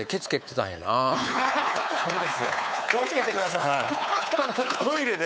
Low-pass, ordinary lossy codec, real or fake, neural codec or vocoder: none; none; fake; codec, 16 kHz, 4 kbps, X-Codec, WavLM features, trained on Multilingual LibriSpeech